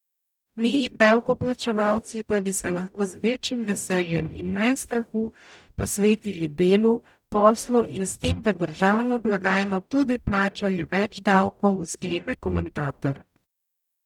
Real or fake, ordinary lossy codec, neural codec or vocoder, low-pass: fake; none; codec, 44.1 kHz, 0.9 kbps, DAC; 19.8 kHz